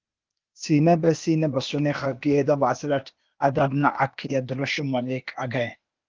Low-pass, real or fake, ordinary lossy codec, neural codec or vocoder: 7.2 kHz; fake; Opus, 24 kbps; codec, 16 kHz, 0.8 kbps, ZipCodec